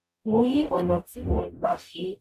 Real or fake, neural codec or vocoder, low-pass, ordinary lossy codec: fake; codec, 44.1 kHz, 0.9 kbps, DAC; 14.4 kHz; none